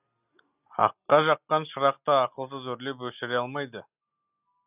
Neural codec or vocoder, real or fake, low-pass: none; real; 3.6 kHz